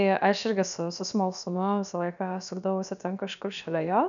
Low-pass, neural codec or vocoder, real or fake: 7.2 kHz; codec, 16 kHz, about 1 kbps, DyCAST, with the encoder's durations; fake